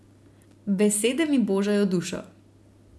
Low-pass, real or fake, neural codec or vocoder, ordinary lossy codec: none; real; none; none